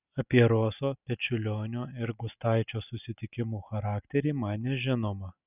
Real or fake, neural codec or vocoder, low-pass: real; none; 3.6 kHz